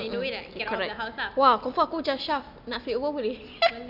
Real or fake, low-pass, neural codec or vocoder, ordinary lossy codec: real; 5.4 kHz; none; none